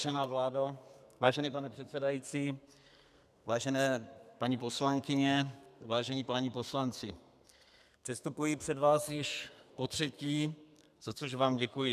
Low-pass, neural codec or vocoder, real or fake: 14.4 kHz; codec, 32 kHz, 1.9 kbps, SNAC; fake